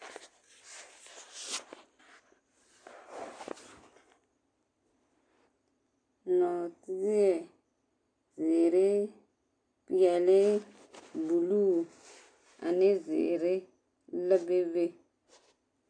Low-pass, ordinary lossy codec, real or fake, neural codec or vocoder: 9.9 kHz; AAC, 48 kbps; real; none